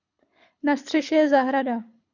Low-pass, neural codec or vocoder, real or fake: 7.2 kHz; codec, 24 kHz, 6 kbps, HILCodec; fake